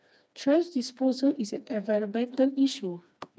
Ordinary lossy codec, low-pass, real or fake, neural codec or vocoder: none; none; fake; codec, 16 kHz, 2 kbps, FreqCodec, smaller model